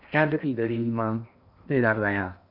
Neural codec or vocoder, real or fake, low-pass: codec, 16 kHz in and 24 kHz out, 0.8 kbps, FocalCodec, streaming, 65536 codes; fake; 5.4 kHz